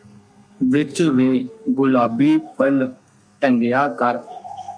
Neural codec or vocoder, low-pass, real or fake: codec, 32 kHz, 1.9 kbps, SNAC; 9.9 kHz; fake